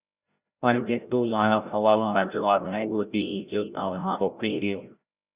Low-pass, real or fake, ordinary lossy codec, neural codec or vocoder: 3.6 kHz; fake; Opus, 64 kbps; codec, 16 kHz, 0.5 kbps, FreqCodec, larger model